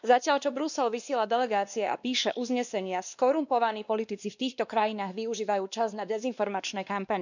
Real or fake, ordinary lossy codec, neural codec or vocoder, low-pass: fake; none; codec, 16 kHz, 2 kbps, X-Codec, WavLM features, trained on Multilingual LibriSpeech; 7.2 kHz